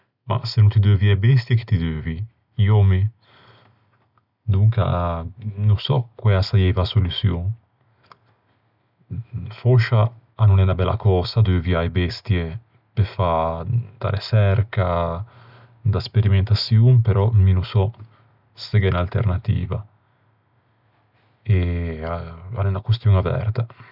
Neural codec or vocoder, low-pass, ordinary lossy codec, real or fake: none; 5.4 kHz; none; real